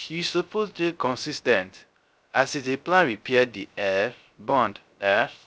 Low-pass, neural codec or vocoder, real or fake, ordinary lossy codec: none; codec, 16 kHz, 0.3 kbps, FocalCodec; fake; none